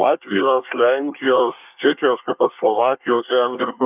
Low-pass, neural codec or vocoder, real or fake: 3.6 kHz; codec, 24 kHz, 1 kbps, SNAC; fake